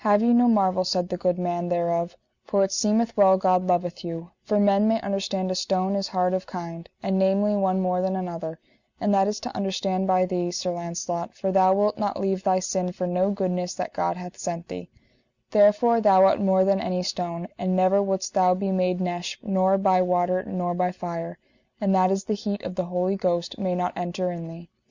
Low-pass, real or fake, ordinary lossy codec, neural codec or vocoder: 7.2 kHz; real; Opus, 64 kbps; none